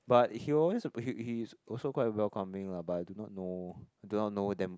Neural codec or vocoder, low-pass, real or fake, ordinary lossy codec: none; none; real; none